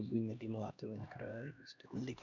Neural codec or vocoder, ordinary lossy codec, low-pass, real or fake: codec, 16 kHz, 1 kbps, X-Codec, HuBERT features, trained on LibriSpeech; none; 7.2 kHz; fake